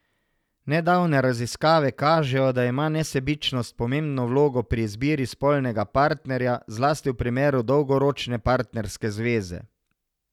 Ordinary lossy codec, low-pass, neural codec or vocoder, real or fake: none; 19.8 kHz; none; real